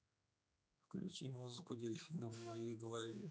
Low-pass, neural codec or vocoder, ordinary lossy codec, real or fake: none; codec, 16 kHz, 2 kbps, X-Codec, HuBERT features, trained on general audio; none; fake